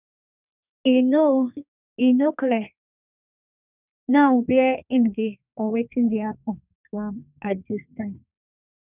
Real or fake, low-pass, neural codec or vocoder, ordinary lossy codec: fake; 3.6 kHz; codec, 32 kHz, 1.9 kbps, SNAC; none